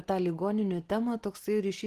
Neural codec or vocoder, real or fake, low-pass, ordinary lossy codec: none; real; 14.4 kHz; Opus, 16 kbps